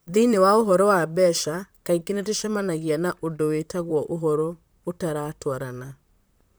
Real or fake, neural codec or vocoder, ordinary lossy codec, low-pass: fake; vocoder, 44.1 kHz, 128 mel bands, Pupu-Vocoder; none; none